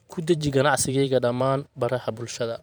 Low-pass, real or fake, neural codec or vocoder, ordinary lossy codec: none; fake; vocoder, 44.1 kHz, 128 mel bands every 512 samples, BigVGAN v2; none